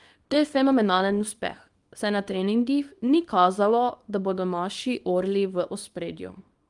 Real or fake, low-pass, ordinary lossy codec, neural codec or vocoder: fake; 10.8 kHz; Opus, 32 kbps; codec, 24 kHz, 0.9 kbps, WavTokenizer, small release